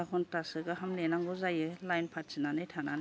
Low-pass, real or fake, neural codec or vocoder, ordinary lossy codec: none; real; none; none